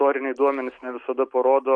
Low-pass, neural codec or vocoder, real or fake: 9.9 kHz; none; real